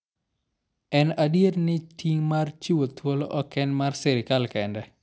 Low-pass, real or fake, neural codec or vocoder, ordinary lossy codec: none; real; none; none